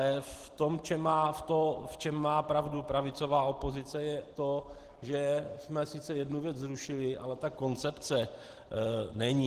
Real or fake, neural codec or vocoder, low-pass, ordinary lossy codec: real; none; 14.4 kHz; Opus, 16 kbps